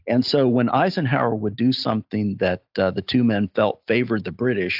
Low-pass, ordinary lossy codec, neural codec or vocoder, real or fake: 5.4 kHz; AAC, 48 kbps; none; real